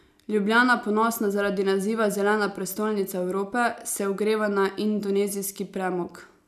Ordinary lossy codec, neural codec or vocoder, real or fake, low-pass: none; none; real; 14.4 kHz